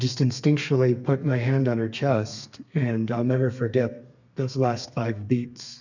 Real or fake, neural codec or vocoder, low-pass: fake; codec, 32 kHz, 1.9 kbps, SNAC; 7.2 kHz